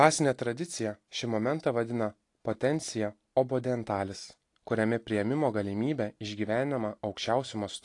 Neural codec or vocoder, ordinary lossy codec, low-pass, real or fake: none; AAC, 48 kbps; 10.8 kHz; real